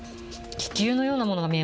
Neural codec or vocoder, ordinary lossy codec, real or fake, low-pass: none; none; real; none